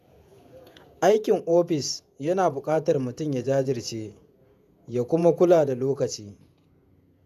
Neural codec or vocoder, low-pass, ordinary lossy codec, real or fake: vocoder, 48 kHz, 128 mel bands, Vocos; 14.4 kHz; none; fake